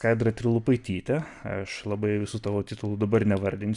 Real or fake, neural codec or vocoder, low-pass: real; none; 10.8 kHz